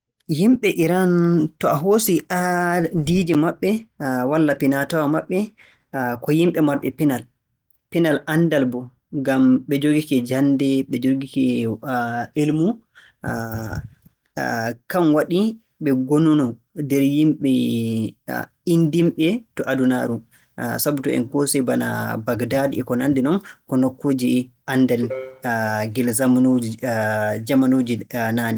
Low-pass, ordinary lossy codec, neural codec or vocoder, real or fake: 19.8 kHz; Opus, 24 kbps; none; real